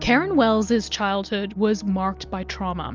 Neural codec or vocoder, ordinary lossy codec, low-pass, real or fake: none; Opus, 32 kbps; 7.2 kHz; real